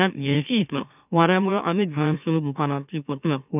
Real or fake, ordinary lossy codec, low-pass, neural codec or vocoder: fake; none; 3.6 kHz; autoencoder, 44.1 kHz, a latent of 192 numbers a frame, MeloTTS